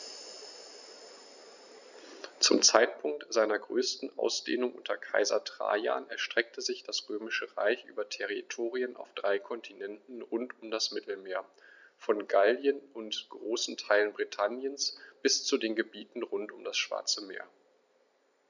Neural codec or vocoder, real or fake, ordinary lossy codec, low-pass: none; real; none; 7.2 kHz